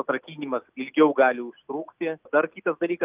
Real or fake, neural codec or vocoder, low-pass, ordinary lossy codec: real; none; 3.6 kHz; Opus, 24 kbps